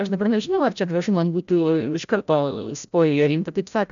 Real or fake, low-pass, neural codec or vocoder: fake; 7.2 kHz; codec, 16 kHz, 0.5 kbps, FreqCodec, larger model